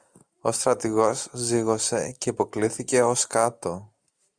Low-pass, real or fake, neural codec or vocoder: 9.9 kHz; real; none